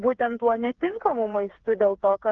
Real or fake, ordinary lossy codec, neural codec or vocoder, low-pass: fake; Opus, 16 kbps; codec, 16 kHz, 4 kbps, FreqCodec, smaller model; 7.2 kHz